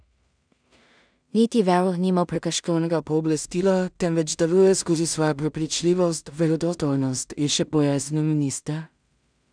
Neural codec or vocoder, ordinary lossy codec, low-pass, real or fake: codec, 16 kHz in and 24 kHz out, 0.4 kbps, LongCat-Audio-Codec, two codebook decoder; none; 9.9 kHz; fake